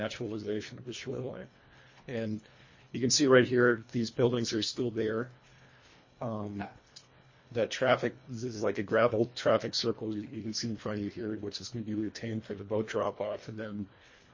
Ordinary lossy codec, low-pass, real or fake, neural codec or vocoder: MP3, 32 kbps; 7.2 kHz; fake; codec, 24 kHz, 1.5 kbps, HILCodec